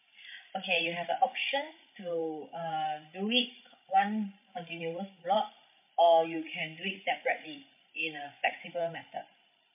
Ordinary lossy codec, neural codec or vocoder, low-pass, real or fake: MP3, 32 kbps; codec, 16 kHz, 16 kbps, FreqCodec, larger model; 3.6 kHz; fake